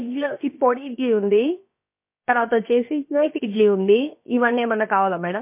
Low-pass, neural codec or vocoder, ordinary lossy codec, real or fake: 3.6 kHz; codec, 16 kHz, about 1 kbps, DyCAST, with the encoder's durations; MP3, 24 kbps; fake